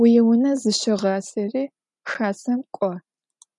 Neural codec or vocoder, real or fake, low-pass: none; real; 10.8 kHz